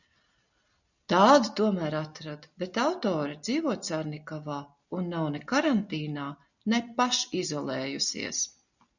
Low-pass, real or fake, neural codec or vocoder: 7.2 kHz; real; none